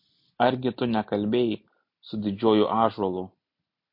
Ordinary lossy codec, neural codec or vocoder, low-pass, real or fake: MP3, 32 kbps; none; 5.4 kHz; real